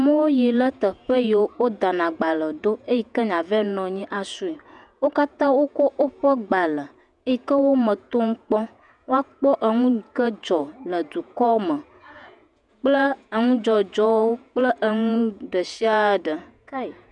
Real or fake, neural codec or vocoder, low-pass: fake; vocoder, 48 kHz, 128 mel bands, Vocos; 10.8 kHz